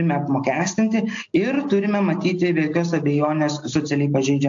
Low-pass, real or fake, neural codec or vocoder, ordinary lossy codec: 7.2 kHz; real; none; AAC, 64 kbps